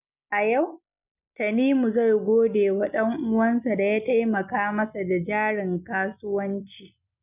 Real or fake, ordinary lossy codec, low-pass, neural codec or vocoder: real; none; 3.6 kHz; none